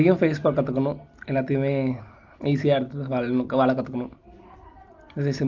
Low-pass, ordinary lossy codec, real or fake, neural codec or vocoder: 7.2 kHz; Opus, 32 kbps; real; none